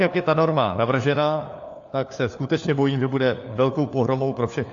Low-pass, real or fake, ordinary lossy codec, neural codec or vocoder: 7.2 kHz; fake; AAC, 48 kbps; codec, 16 kHz, 4 kbps, FunCodec, trained on LibriTTS, 50 frames a second